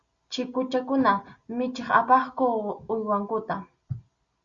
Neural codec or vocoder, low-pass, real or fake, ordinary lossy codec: none; 7.2 kHz; real; Opus, 64 kbps